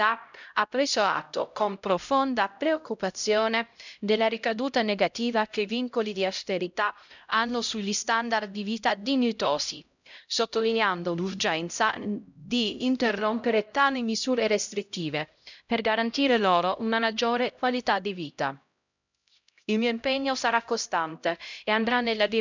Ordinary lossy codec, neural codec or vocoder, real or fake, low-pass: none; codec, 16 kHz, 0.5 kbps, X-Codec, HuBERT features, trained on LibriSpeech; fake; 7.2 kHz